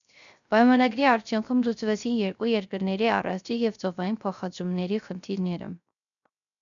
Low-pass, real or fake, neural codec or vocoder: 7.2 kHz; fake; codec, 16 kHz, 0.3 kbps, FocalCodec